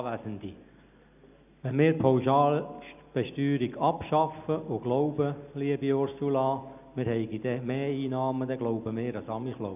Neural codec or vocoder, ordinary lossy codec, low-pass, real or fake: none; none; 3.6 kHz; real